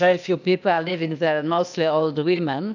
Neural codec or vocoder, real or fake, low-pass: codec, 16 kHz, 0.8 kbps, ZipCodec; fake; 7.2 kHz